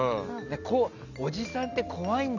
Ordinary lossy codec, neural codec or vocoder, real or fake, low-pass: none; none; real; 7.2 kHz